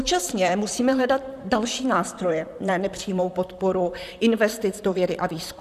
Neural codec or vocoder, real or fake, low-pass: vocoder, 44.1 kHz, 128 mel bands, Pupu-Vocoder; fake; 14.4 kHz